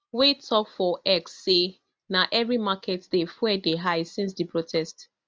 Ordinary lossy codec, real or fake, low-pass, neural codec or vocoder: none; real; none; none